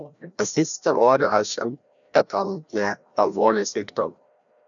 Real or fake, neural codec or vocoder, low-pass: fake; codec, 16 kHz, 1 kbps, FreqCodec, larger model; 7.2 kHz